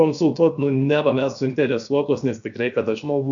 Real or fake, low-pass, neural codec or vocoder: fake; 7.2 kHz; codec, 16 kHz, 0.7 kbps, FocalCodec